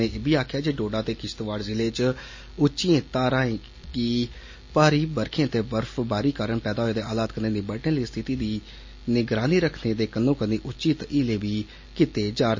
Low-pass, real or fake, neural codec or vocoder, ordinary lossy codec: 7.2 kHz; real; none; MP3, 32 kbps